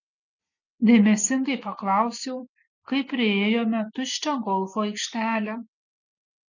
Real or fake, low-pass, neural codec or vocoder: real; 7.2 kHz; none